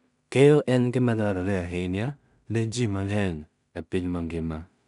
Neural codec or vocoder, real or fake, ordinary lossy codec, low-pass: codec, 16 kHz in and 24 kHz out, 0.4 kbps, LongCat-Audio-Codec, two codebook decoder; fake; none; 10.8 kHz